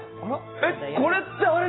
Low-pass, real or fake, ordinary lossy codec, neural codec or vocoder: 7.2 kHz; real; AAC, 16 kbps; none